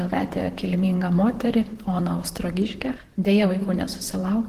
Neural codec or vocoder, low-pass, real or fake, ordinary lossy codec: vocoder, 44.1 kHz, 128 mel bands, Pupu-Vocoder; 14.4 kHz; fake; Opus, 16 kbps